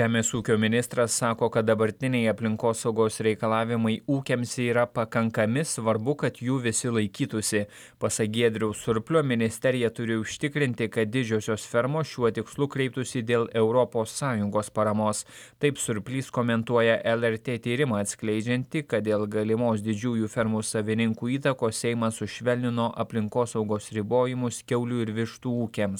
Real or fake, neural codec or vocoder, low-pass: real; none; 19.8 kHz